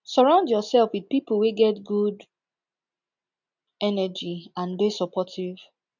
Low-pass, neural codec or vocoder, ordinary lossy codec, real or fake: 7.2 kHz; none; none; real